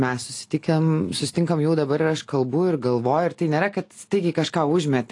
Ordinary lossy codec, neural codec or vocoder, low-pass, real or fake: AAC, 48 kbps; none; 10.8 kHz; real